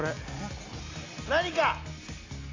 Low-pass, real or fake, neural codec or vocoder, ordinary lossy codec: 7.2 kHz; real; none; AAC, 32 kbps